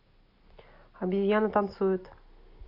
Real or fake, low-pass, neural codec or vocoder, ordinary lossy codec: real; 5.4 kHz; none; AAC, 32 kbps